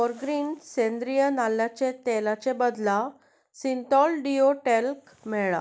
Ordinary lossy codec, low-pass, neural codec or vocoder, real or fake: none; none; none; real